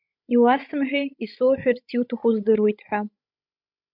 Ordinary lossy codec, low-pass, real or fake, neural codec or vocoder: MP3, 48 kbps; 5.4 kHz; fake; codec, 16 kHz, 16 kbps, FreqCodec, larger model